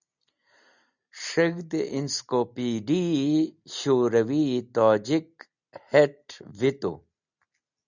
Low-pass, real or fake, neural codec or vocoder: 7.2 kHz; real; none